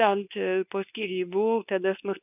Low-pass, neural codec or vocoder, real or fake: 3.6 kHz; codec, 24 kHz, 0.9 kbps, WavTokenizer, medium speech release version 2; fake